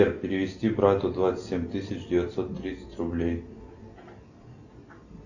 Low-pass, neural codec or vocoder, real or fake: 7.2 kHz; none; real